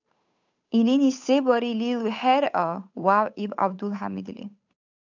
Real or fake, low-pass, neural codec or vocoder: fake; 7.2 kHz; codec, 16 kHz, 8 kbps, FunCodec, trained on Chinese and English, 25 frames a second